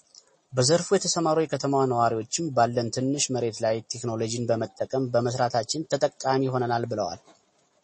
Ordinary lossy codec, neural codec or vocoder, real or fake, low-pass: MP3, 32 kbps; none; real; 9.9 kHz